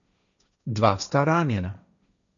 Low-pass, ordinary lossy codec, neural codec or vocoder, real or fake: 7.2 kHz; none; codec, 16 kHz, 1.1 kbps, Voila-Tokenizer; fake